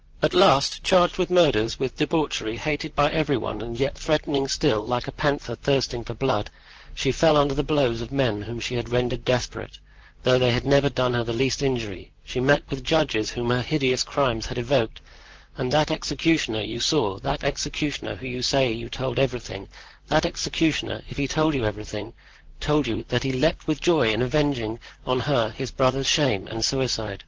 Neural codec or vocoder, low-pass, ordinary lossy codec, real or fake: vocoder, 44.1 kHz, 128 mel bands, Pupu-Vocoder; 7.2 kHz; Opus, 16 kbps; fake